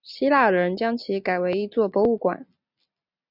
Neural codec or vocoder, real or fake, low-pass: none; real; 5.4 kHz